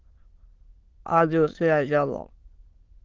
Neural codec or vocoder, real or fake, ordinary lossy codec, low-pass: autoencoder, 22.05 kHz, a latent of 192 numbers a frame, VITS, trained on many speakers; fake; Opus, 16 kbps; 7.2 kHz